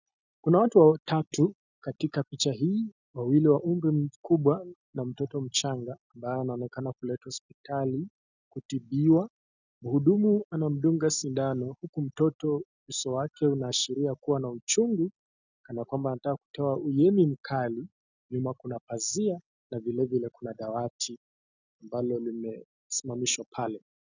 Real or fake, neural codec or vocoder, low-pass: real; none; 7.2 kHz